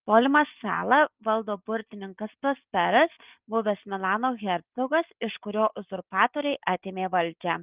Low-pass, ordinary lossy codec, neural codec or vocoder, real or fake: 3.6 kHz; Opus, 24 kbps; none; real